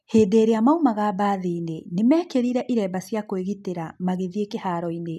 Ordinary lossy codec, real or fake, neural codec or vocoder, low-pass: none; real; none; 14.4 kHz